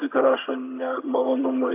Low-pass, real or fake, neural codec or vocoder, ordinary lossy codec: 3.6 kHz; fake; vocoder, 22.05 kHz, 80 mel bands, HiFi-GAN; AAC, 24 kbps